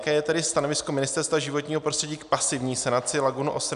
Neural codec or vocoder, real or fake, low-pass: none; real; 10.8 kHz